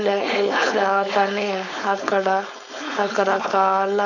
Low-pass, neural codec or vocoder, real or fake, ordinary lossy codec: 7.2 kHz; codec, 16 kHz, 4.8 kbps, FACodec; fake; none